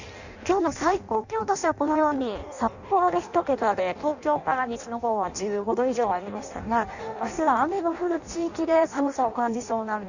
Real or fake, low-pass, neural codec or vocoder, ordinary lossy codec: fake; 7.2 kHz; codec, 16 kHz in and 24 kHz out, 0.6 kbps, FireRedTTS-2 codec; none